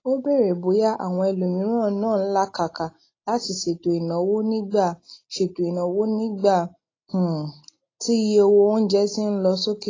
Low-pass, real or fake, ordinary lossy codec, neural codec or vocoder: 7.2 kHz; real; AAC, 32 kbps; none